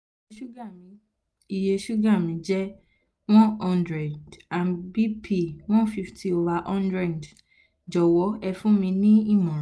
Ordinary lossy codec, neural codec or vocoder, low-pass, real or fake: none; none; none; real